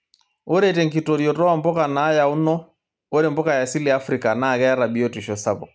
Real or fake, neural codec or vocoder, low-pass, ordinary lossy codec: real; none; none; none